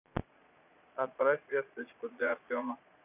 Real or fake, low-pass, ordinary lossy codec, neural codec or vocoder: fake; 3.6 kHz; none; vocoder, 22.05 kHz, 80 mel bands, Vocos